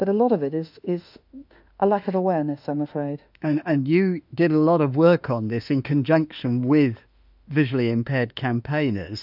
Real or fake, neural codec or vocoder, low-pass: fake; autoencoder, 48 kHz, 32 numbers a frame, DAC-VAE, trained on Japanese speech; 5.4 kHz